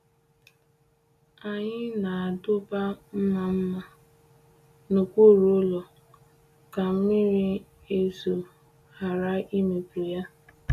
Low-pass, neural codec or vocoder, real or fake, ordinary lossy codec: 14.4 kHz; none; real; none